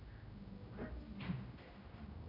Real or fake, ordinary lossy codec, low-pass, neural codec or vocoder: fake; none; 5.4 kHz; codec, 16 kHz, 0.5 kbps, X-Codec, HuBERT features, trained on general audio